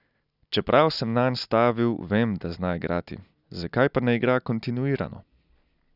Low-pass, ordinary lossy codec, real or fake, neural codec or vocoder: 5.4 kHz; none; real; none